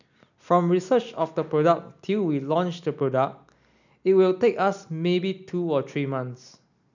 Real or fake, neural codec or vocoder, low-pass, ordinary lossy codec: real; none; 7.2 kHz; none